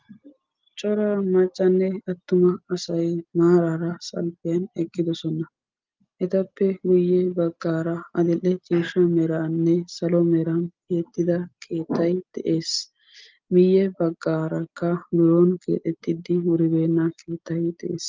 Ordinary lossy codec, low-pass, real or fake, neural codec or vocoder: Opus, 24 kbps; 7.2 kHz; real; none